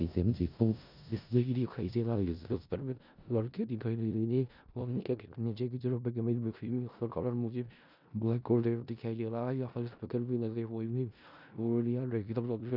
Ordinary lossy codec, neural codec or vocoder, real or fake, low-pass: none; codec, 16 kHz in and 24 kHz out, 0.4 kbps, LongCat-Audio-Codec, four codebook decoder; fake; 5.4 kHz